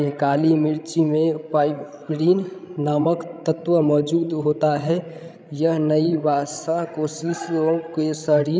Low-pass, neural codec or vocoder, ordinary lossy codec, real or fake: none; codec, 16 kHz, 16 kbps, FreqCodec, larger model; none; fake